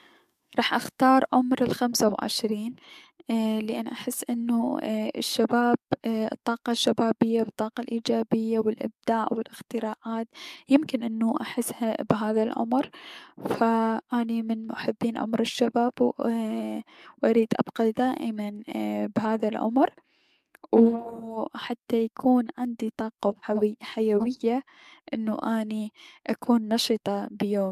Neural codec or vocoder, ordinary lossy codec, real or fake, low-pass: codec, 44.1 kHz, 7.8 kbps, DAC; MP3, 96 kbps; fake; 14.4 kHz